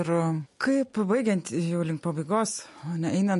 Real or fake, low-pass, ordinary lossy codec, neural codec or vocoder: real; 14.4 kHz; MP3, 48 kbps; none